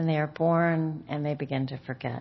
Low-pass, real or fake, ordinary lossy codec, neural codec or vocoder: 7.2 kHz; real; MP3, 24 kbps; none